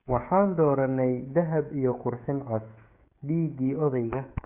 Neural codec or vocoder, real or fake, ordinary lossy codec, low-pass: codec, 16 kHz, 16 kbps, FreqCodec, smaller model; fake; Opus, 64 kbps; 3.6 kHz